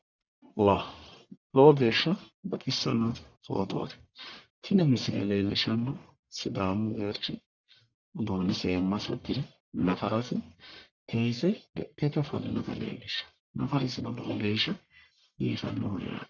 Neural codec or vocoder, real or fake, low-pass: codec, 44.1 kHz, 1.7 kbps, Pupu-Codec; fake; 7.2 kHz